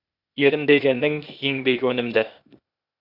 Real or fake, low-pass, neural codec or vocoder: fake; 5.4 kHz; codec, 16 kHz, 0.8 kbps, ZipCodec